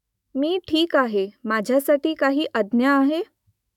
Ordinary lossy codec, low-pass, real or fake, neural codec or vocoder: none; 19.8 kHz; fake; autoencoder, 48 kHz, 128 numbers a frame, DAC-VAE, trained on Japanese speech